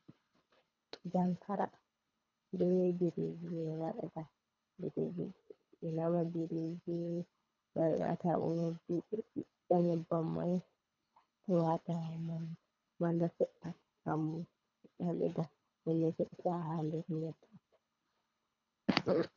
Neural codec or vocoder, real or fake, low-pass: codec, 24 kHz, 3 kbps, HILCodec; fake; 7.2 kHz